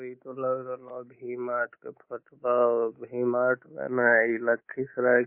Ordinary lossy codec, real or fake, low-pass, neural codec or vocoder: MP3, 24 kbps; fake; 3.6 kHz; codec, 24 kHz, 3.1 kbps, DualCodec